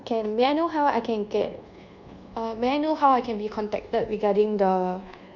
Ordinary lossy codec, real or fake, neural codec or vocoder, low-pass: none; fake; codec, 24 kHz, 1.2 kbps, DualCodec; 7.2 kHz